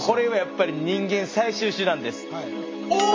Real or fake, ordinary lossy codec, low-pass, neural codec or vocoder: real; MP3, 48 kbps; 7.2 kHz; none